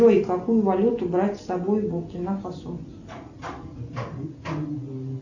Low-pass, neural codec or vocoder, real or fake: 7.2 kHz; none; real